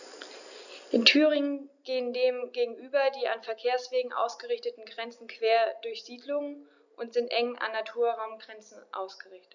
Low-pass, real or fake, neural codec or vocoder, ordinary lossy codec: 7.2 kHz; real; none; none